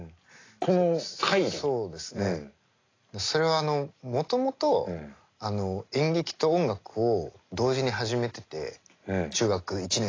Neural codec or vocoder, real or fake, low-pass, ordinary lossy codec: none; real; 7.2 kHz; AAC, 32 kbps